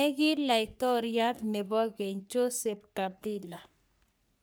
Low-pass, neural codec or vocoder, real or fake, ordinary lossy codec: none; codec, 44.1 kHz, 3.4 kbps, Pupu-Codec; fake; none